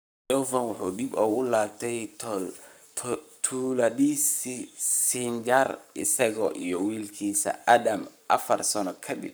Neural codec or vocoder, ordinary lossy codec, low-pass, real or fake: codec, 44.1 kHz, 7.8 kbps, Pupu-Codec; none; none; fake